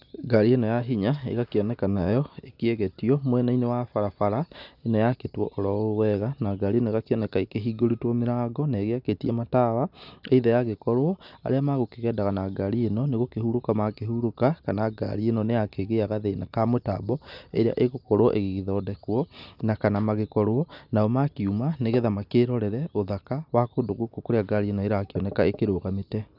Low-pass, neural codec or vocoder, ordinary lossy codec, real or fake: 5.4 kHz; none; none; real